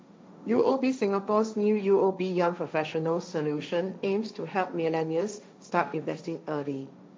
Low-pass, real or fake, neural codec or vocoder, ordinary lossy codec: none; fake; codec, 16 kHz, 1.1 kbps, Voila-Tokenizer; none